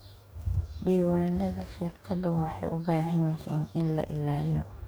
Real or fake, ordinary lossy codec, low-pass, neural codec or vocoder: fake; none; none; codec, 44.1 kHz, 2.6 kbps, DAC